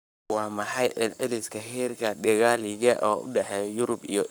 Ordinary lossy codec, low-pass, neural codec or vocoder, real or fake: none; none; codec, 44.1 kHz, 7.8 kbps, Pupu-Codec; fake